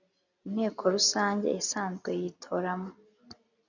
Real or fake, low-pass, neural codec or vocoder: real; 7.2 kHz; none